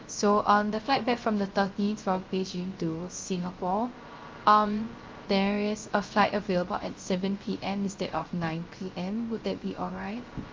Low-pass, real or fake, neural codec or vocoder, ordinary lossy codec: 7.2 kHz; fake; codec, 16 kHz, 0.3 kbps, FocalCodec; Opus, 32 kbps